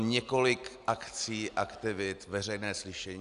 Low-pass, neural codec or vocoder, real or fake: 10.8 kHz; none; real